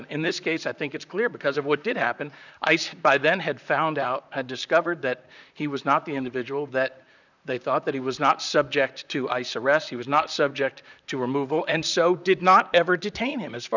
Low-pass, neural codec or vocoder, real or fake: 7.2 kHz; vocoder, 44.1 kHz, 128 mel bands, Pupu-Vocoder; fake